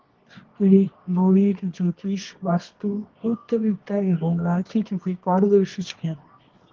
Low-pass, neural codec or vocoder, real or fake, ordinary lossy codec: 7.2 kHz; codec, 24 kHz, 0.9 kbps, WavTokenizer, medium music audio release; fake; Opus, 16 kbps